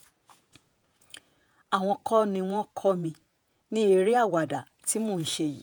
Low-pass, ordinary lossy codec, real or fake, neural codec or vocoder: none; none; fake; vocoder, 48 kHz, 128 mel bands, Vocos